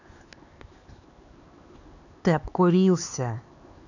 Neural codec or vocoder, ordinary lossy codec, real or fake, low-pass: codec, 16 kHz, 4 kbps, X-Codec, HuBERT features, trained on LibriSpeech; none; fake; 7.2 kHz